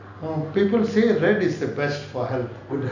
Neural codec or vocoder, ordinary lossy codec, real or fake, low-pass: none; none; real; 7.2 kHz